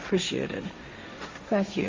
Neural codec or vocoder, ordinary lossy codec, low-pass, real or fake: codec, 16 kHz, 1.1 kbps, Voila-Tokenizer; Opus, 32 kbps; 7.2 kHz; fake